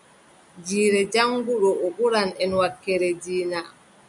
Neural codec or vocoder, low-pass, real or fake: none; 10.8 kHz; real